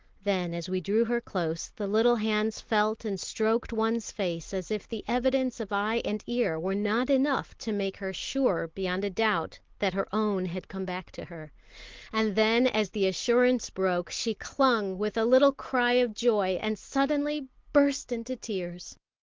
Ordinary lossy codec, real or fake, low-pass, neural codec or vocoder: Opus, 16 kbps; real; 7.2 kHz; none